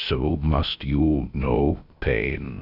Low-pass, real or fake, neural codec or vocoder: 5.4 kHz; fake; codec, 16 kHz, about 1 kbps, DyCAST, with the encoder's durations